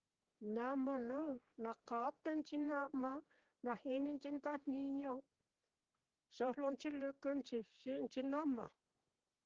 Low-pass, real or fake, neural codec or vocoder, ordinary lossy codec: 7.2 kHz; fake; codec, 16 kHz, 4 kbps, X-Codec, HuBERT features, trained on general audio; Opus, 16 kbps